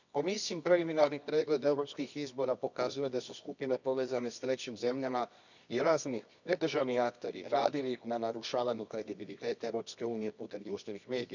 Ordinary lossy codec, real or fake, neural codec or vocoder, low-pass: none; fake; codec, 24 kHz, 0.9 kbps, WavTokenizer, medium music audio release; 7.2 kHz